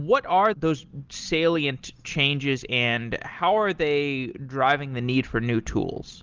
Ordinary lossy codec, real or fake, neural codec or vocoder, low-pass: Opus, 32 kbps; real; none; 7.2 kHz